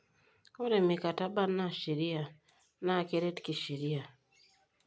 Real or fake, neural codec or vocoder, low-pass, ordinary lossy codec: real; none; none; none